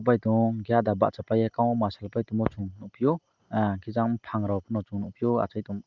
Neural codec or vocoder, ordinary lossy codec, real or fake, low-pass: none; Opus, 24 kbps; real; 7.2 kHz